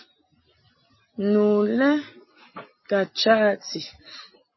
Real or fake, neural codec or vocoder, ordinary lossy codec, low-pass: real; none; MP3, 24 kbps; 7.2 kHz